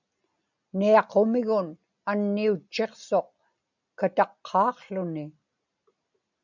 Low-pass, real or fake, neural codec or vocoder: 7.2 kHz; real; none